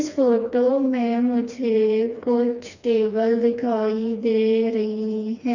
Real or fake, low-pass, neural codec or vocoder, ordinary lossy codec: fake; 7.2 kHz; codec, 16 kHz, 2 kbps, FreqCodec, smaller model; none